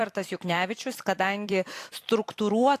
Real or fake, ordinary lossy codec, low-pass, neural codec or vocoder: fake; AAC, 64 kbps; 14.4 kHz; vocoder, 44.1 kHz, 128 mel bands every 256 samples, BigVGAN v2